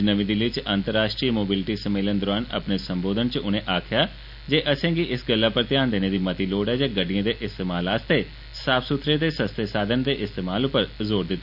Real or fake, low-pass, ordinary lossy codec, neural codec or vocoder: real; 5.4 kHz; none; none